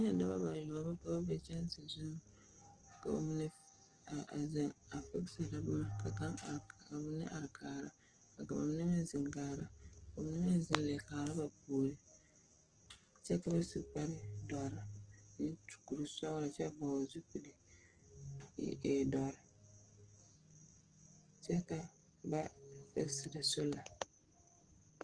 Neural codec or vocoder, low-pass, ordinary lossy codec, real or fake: codec, 44.1 kHz, 7.8 kbps, DAC; 9.9 kHz; Opus, 16 kbps; fake